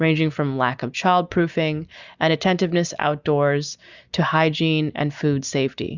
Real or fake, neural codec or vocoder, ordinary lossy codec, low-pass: real; none; Opus, 64 kbps; 7.2 kHz